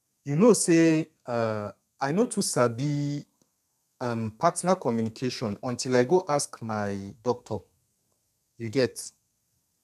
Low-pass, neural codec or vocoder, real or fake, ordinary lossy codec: 14.4 kHz; codec, 32 kHz, 1.9 kbps, SNAC; fake; none